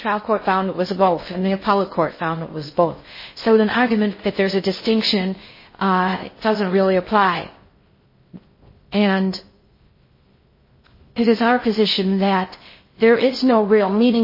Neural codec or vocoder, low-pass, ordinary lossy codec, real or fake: codec, 16 kHz in and 24 kHz out, 0.6 kbps, FocalCodec, streaming, 2048 codes; 5.4 kHz; MP3, 24 kbps; fake